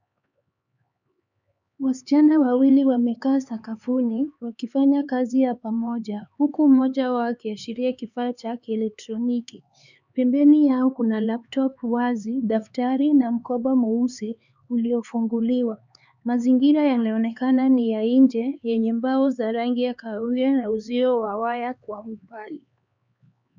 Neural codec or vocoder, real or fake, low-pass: codec, 16 kHz, 4 kbps, X-Codec, HuBERT features, trained on LibriSpeech; fake; 7.2 kHz